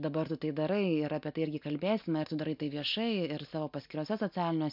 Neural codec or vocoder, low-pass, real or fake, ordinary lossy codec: none; 5.4 kHz; real; MP3, 48 kbps